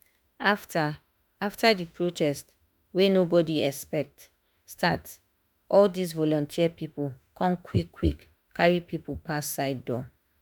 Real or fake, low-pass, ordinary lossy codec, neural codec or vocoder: fake; none; none; autoencoder, 48 kHz, 32 numbers a frame, DAC-VAE, trained on Japanese speech